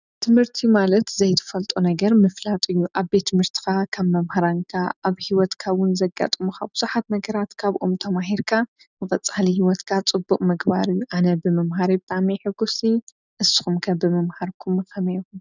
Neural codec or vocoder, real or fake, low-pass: none; real; 7.2 kHz